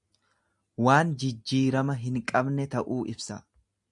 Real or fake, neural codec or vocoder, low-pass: real; none; 10.8 kHz